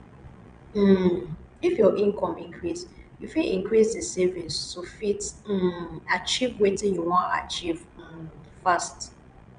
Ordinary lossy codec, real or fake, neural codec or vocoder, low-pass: none; fake; vocoder, 22.05 kHz, 80 mel bands, Vocos; 9.9 kHz